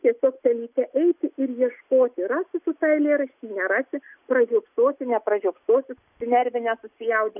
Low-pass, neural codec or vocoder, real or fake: 3.6 kHz; none; real